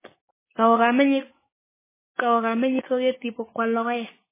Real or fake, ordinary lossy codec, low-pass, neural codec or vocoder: fake; MP3, 16 kbps; 3.6 kHz; codec, 16 kHz, 6 kbps, DAC